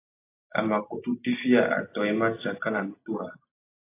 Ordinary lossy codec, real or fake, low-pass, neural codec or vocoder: AAC, 24 kbps; real; 3.6 kHz; none